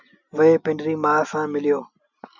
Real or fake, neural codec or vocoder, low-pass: real; none; 7.2 kHz